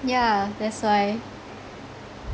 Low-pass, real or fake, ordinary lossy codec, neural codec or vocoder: none; real; none; none